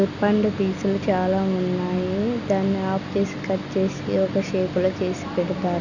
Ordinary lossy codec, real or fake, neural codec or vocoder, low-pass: none; real; none; 7.2 kHz